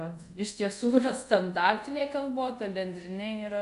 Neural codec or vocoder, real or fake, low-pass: codec, 24 kHz, 0.5 kbps, DualCodec; fake; 10.8 kHz